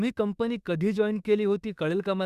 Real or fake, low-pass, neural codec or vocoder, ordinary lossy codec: fake; 14.4 kHz; autoencoder, 48 kHz, 32 numbers a frame, DAC-VAE, trained on Japanese speech; Opus, 64 kbps